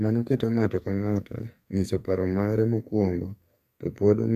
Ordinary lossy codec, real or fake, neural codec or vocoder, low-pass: AAC, 96 kbps; fake; codec, 44.1 kHz, 2.6 kbps, SNAC; 14.4 kHz